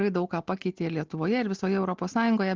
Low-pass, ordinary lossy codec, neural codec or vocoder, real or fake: 7.2 kHz; Opus, 16 kbps; none; real